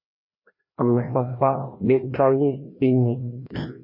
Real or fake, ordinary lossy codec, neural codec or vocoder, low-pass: fake; MP3, 24 kbps; codec, 16 kHz, 1 kbps, FreqCodec, larger model; 5.4 kHz